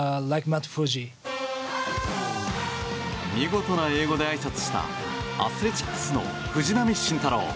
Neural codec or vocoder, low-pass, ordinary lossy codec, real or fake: none; none; none; real